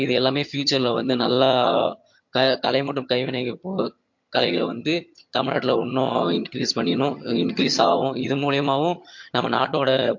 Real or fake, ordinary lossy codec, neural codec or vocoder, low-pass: fake; MP3, 48 kbps; vocoder, 22.05 kHz, 80 mel bands, HiFi-GAN; 7.2 kHz